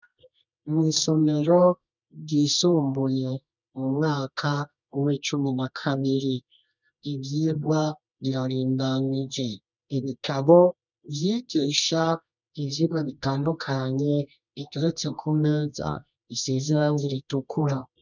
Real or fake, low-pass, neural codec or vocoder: fake; 7.2 kHz; codec, 24 kHz, 0.9 kbps, WavTokenizer, medium music audio release